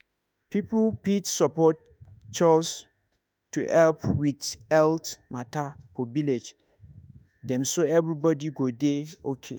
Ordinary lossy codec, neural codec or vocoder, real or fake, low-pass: none; autoencoder, 48 kHz, 32 numbers a frame, DAC-VAE, trained on Japanese speech; fake; none